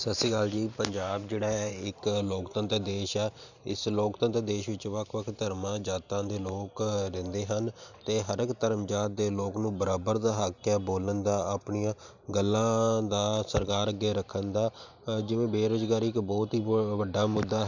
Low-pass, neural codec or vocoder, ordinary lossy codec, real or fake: 7.2 kHz; vocoder, 44.1 kHz, 128 mel bands every 512 samples, BigVGAN v2; none; fake